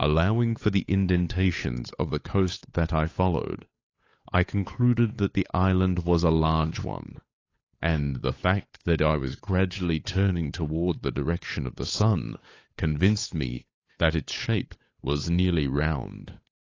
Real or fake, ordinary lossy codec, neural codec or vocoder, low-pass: fake; AAC, 32 kbps; codec, 16 kHz, 8 kbps, FunCodec, trained on Chinese and English, 25 frames a second; 7.2 kHz